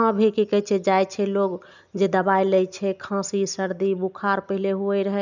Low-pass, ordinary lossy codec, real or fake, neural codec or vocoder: 7.2 kHz; none; real; none